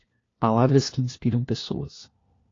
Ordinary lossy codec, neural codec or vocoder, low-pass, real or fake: AAC, 32 kbps; codec, 16 kHz, 1 kbps, FunCodec, trained on LibriTTS, 50 frames a second; 7.2 kHz; fake